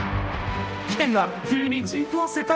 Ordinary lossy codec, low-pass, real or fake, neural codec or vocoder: none; none; fake; codec, 16 kHz, 0.5 kbps, X-Codec, HuBERT features, trained on balanced general audio